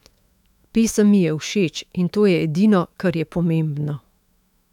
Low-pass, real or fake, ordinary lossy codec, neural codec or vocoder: 19.8 kHz; fake; none; autoencoder, 48 kHz, 128 numbers a frame, DAC-VAE, trained on Japanese speech